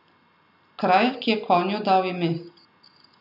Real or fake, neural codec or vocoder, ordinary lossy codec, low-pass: real; none; none; 5.4 kHz